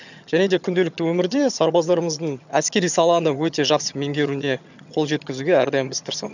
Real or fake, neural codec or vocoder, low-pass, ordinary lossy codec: fake; vocoder, 22.05 kHz, 80 mel bands, HiFi-GAN; 7.2 kHz; none